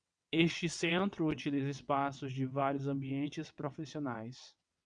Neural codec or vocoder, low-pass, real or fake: vocoder, 22.05 kHz, 80 mel bands, WaveNeXt; 9.9 kHz; fake